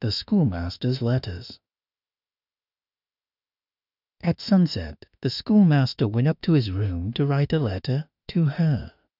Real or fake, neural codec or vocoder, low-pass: fake; autoencoder, 48 kHz, 32 numbers a frame, DAC-VAE, trained on Japanese speech; 5.4 kHz